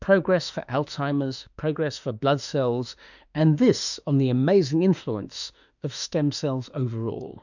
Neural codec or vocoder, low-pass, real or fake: autoencoder, 48 kHz, 32 numbers a frame, DAC-VAE, trained on Japanese speech; 7.2 kHz; fake